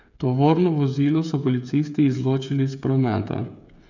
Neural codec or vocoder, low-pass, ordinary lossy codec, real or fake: codec, 16 kHz, 8 kbps, FreqCodec, smaller model; 7.2 kHz; none; fake